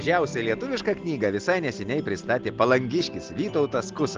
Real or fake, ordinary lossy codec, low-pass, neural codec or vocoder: real; Opus, 16 kbps; 7.2 kHz; none